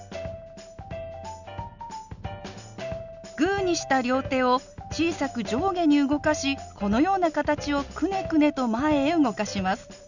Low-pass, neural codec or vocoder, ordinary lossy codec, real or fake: 7.2 kHz; none; none; real